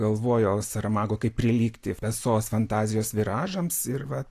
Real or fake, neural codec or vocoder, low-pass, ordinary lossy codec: real; none; 14.4 kHz; AAC, 64 kbps